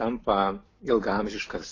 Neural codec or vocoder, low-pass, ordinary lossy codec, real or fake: none; 7.2 kHz; AAC, 32 kbps; real